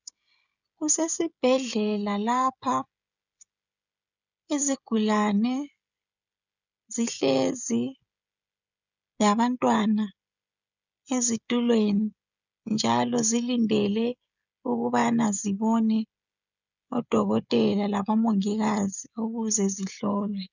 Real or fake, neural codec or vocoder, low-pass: fake; codec, 16 kHz, 16 kbps, FreqCodec, smaller model; 7.2 kHz